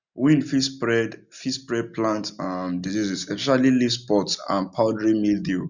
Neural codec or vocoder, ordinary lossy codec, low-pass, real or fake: none; none; 7.2 kHz; real